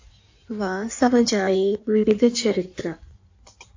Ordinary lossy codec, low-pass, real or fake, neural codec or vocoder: AAC, 48 kbps; 7.2 kHz; fake; codec, 16 kHz in and 24 kHz out, 1.1 kbps, FireRedTTS-2 codec